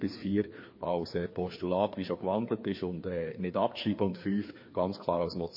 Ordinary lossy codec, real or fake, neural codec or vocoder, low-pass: MP3, 24 kbps; fake; codec, 16 kHz, 2 kbps, FreqCodec, larger model; 5.4 kHz